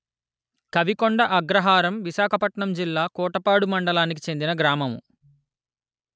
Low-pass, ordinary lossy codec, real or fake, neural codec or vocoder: none; none; real; none